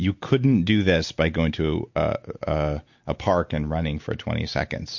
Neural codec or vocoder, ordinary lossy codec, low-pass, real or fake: none; MP3, 48 kbps; 7.2 kHz; real